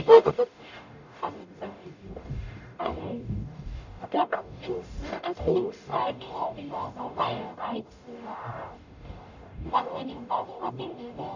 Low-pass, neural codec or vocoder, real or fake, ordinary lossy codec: 7.2 kHz; codec, 44.1 kHz, 0.9 kbps, DAC; fake; none